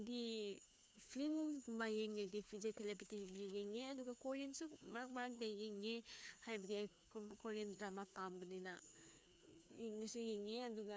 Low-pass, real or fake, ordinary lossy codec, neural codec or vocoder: none; fake; none; codec, 16 kHz, 2 kbps, FreqCodec, larger model